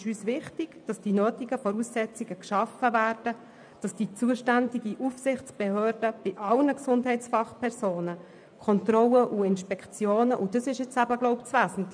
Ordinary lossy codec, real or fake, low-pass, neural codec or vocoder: none; fake; 9.9 kHz; vocoder, 24 kHz, 100 mel bands, Vocos